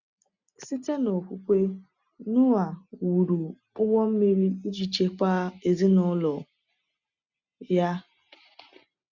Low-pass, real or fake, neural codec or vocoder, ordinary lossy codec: 7.2 kHz; real; none; none